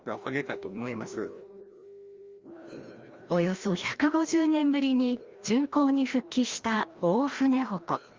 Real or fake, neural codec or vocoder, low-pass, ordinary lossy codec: fake; codec, 16 kHz, 1 kbps, FreqCodec, larger model; 7.2 kHz; Opus, 32 kbps